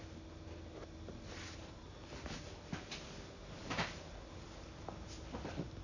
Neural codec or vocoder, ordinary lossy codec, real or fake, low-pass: none; none; real; 7.2 kHz